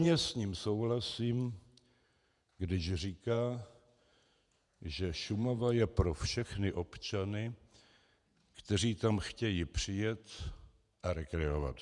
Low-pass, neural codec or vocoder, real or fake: 10.8 kHz; vocoder, 48 kHz, 128 mel bands, Vocos; fake